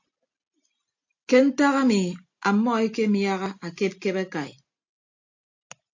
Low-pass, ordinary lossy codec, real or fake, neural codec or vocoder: 7.2 kHz; AAC, 48 kbps; real; none